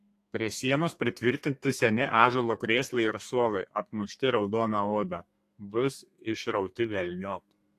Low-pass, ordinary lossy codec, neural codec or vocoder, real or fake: 14.4 kHz; AAC, 64 kbps; codec, 32 kHz, 1.9 kbps, SNAC; fake